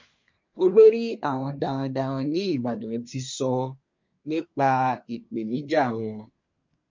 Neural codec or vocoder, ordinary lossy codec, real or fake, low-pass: codec, 24 kHz, 1 kbps, SNAC; MP3, 48 kbps; fake; 7.2 kHz